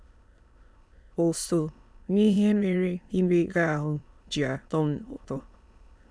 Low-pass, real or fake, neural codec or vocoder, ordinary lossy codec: none; fake; autoencoder, 22.05 kHz, a latent of 192 numbers a frame, VITS, trained on many speakers; none